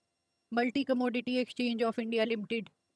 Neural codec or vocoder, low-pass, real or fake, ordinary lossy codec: vocoder, 22.05 kHz, 80 mel bands, HiFi-GAN; none; fake; none